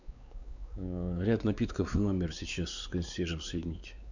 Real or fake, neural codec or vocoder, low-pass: fake; codec, 16 kHz, 4 kbps, X-Codec, WavLM features, trained on Multilingual LibriSpeech; 7.2 kHz